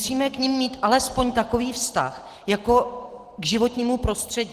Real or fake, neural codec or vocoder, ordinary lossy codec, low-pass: real; none; Opus, 16 kbps; 14.4 kHz